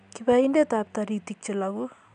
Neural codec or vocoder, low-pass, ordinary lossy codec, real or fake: none; 9.9 kHz; MP3, 96 kbps; real